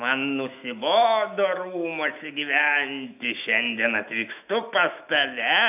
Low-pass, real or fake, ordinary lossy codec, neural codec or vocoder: 3.6 kHz; fake; AAC, 32 kbps; autoencoder, 48 kHz, 128 numbers a frame, DAC-VAE, trained on Japanese speech